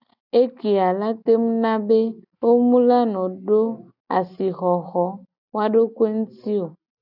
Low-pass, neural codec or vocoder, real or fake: 5.4 kHz; none; real